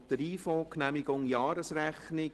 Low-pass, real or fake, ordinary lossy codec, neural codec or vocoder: 10.8 kHz; real; Opus, 16 kbps; none